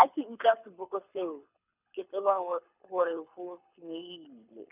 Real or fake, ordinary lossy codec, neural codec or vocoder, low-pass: fake; none; codec, 24 kHz, 6 kbps, HILCodec; 3.6 kHz